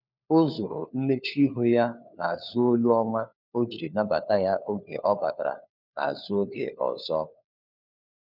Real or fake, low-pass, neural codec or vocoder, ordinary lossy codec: fake; 5.4 kHz; codec, 16 kHz, 4 kbps, FunCodec, trained on LibriTTS, 50 frames a second; none